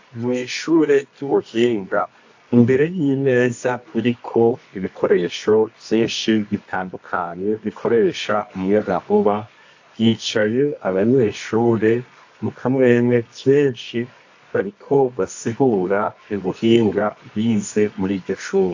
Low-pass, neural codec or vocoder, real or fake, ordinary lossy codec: 7.2 kHz; codec, 24 kHz, 0.9 kbps, WavTokenizer, medium music audio release; fake; AAC, 48 kbps